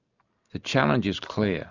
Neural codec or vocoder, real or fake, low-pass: none; real; 7.2 kHz